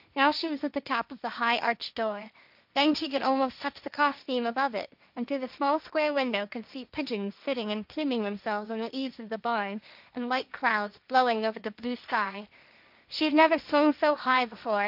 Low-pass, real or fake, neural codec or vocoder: 5.4 kHz; fake; codec, 16 kHz, 1.1 kbps, Voila-Tokenizer